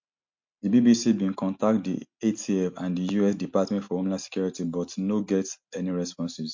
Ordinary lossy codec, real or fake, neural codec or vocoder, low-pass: MP3, 48 kbps; real; none; 7.2 kHz